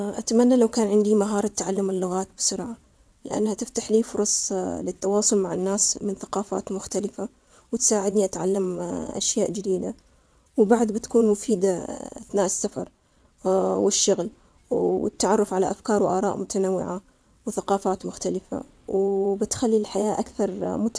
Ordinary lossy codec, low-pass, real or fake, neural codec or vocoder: none; none; fake; vocoder, 22.05 kHz, 80 mel bands, WaveNeXt